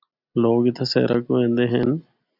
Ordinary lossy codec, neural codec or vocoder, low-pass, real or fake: AAC, 48 kbps; none; 5.4 kHz; real